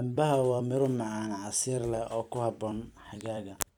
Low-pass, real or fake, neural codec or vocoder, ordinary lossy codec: 19.8 kHz; fake; vocoder, 44.1 kHz, 128 mel bands every 256 samples, BigVGAN v2; none